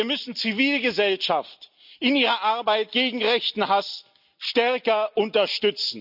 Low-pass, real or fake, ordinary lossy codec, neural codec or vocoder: 5.4 kHz; real; none; none